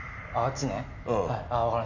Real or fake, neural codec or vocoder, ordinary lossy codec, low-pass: real; none; none; 7.2 kHz